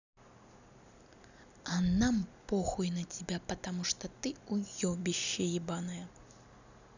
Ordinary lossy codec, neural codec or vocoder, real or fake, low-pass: none; none; real; 7.2 kHz